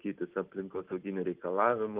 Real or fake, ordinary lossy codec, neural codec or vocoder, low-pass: real; Opus, 24 kbps; none; 3.6 kHz